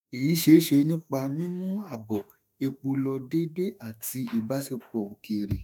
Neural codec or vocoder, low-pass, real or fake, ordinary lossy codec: autoencoder, 48 kHz, 32 numbers a frame, DAC-VAE, trained on Japanese speech; none; fake; none